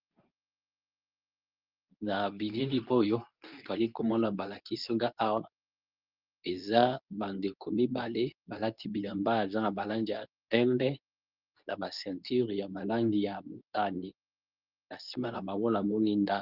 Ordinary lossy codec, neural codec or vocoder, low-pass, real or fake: Opus, 24 kbps; codec, 24 kHz, 0.9 kbps, WavTokenizer, medium speech release version 2; 5.4 kHz; fake